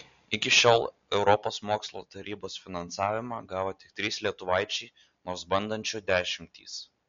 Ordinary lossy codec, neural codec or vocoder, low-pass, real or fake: MP3, 48 kbps; none; 7.2 kHz; real